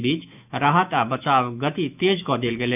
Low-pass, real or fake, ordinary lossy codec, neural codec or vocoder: 3.6 kHz; fake; none; autoencoder, 48 kHz, 128 numbers a frame, DAC-VAE, trained on Japanese speech